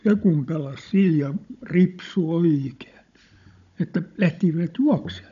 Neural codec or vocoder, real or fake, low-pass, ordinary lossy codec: codec, 16 kHz, 16 kbps, FunCodec, trained on Chinese and English, 50 frames a second; fake; 7.2 kHz; none